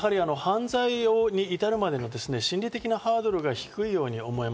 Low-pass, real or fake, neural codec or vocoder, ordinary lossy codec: none; real; none; none